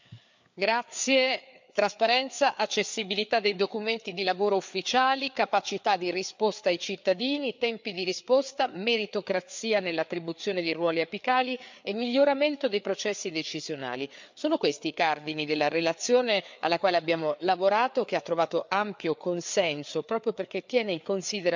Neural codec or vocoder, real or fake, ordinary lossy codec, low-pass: codec, 16 kHz, 4 kbps, FreqCodec, larger model; fake; MP3, 64 kbps; 7.2 kHz